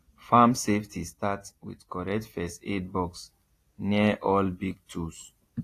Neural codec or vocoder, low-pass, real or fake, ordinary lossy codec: none; 14.4 kHz; real; AAC, 48 kbps